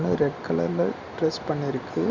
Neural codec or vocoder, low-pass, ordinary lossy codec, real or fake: none; 7.2 kHz; Opus, 64 kbps; real